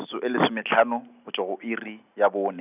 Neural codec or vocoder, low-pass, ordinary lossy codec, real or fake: none; 3.6 kHz; none; real